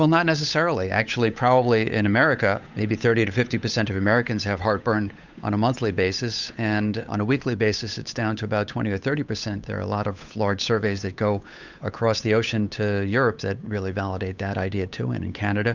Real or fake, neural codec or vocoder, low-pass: fake; codec, 16 kHz, 8 kbps, FunCodec, trained on Chinese and English, 25 frames a second; 7.2 kHz